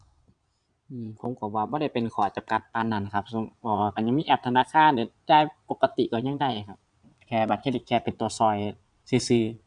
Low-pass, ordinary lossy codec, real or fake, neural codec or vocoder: 9.9 kHz; none; fake; vocoder, 22.05 kHz, 80 mel bands, Vocos